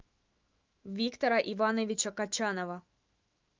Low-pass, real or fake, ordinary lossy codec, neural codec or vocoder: 7.2 kHz; fake; Opus, 32 kbps; autoencoder, 48 kHz, 128 numbers a frame, DAC-VAE, trained on Japanese speech